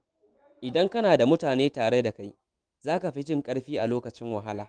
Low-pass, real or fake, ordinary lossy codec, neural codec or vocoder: 9.9 kHz; fake; Opus, 24 kbps; autoencoder, 48 kHz, 128 numbers a frame, DAC-VAE, trained on Japanese speech